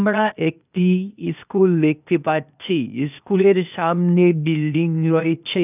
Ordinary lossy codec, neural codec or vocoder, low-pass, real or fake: none; codec, 16 kHz, 0.8 kbps, ZipCodec; 3.6 kHz; fake